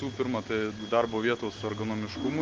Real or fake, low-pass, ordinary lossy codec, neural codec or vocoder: real; 7.2 kHz; Opus, 16 kbps; none